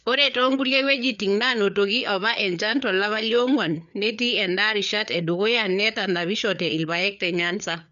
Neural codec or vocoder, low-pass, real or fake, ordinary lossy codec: codec, 16 kHz, 4 kbps, FreqCodec, larger model; 7.2 kHz; fake; none